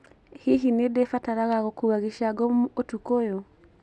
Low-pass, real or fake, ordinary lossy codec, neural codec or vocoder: none; real; none; none